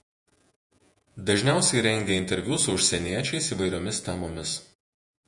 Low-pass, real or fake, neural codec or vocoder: 10.8 kHz; fake; vocoder, 48 kHz, 128 mel bands, Vocos